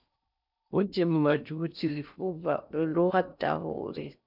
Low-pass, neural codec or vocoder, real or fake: 5.4 kHz; codec, 16 kHz in and 24 kHz out, 0.6 kbps, FocalCodec, streaming, 4096 codes; fake